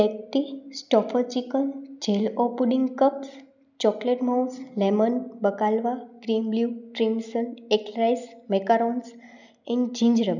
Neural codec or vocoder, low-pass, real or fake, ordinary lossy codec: none; 7.2 kHz; real; none